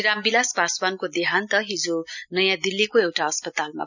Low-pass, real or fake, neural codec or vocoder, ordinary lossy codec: 7.2 kHz; real; none; none